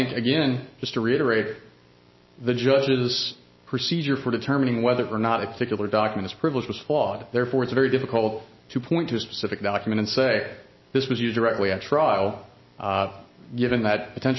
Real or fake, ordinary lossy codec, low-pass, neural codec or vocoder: real; MP3, 24 kbps; 7.2 kHz; none